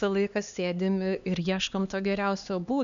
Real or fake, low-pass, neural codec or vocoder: fake; 7.2 kHz; codec, 16 kHz, 2 kbps, X-Codec, HuBERT features, trained on LibriSpeech